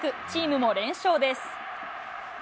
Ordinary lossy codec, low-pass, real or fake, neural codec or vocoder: none; none; real; none